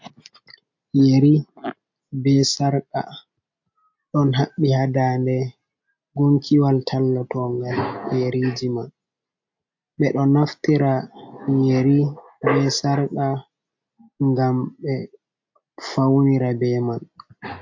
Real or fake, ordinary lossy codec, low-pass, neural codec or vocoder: real; MP3, 48 kbps; 7.2 kHz; none